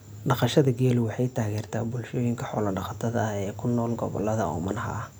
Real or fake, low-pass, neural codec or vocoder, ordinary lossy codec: real; none; none; none